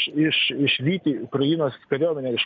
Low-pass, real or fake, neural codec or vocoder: 7.2 kHz; real; none